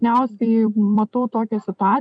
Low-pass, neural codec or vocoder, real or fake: 9.9 kHz; vocoder, 44.1 kHz, 128 mel bands every 256 samples, BigVGAN v2; fake